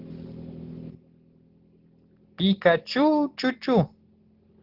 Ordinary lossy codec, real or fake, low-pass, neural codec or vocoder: Opus, 16 kbps; real; 5.4 kHz; none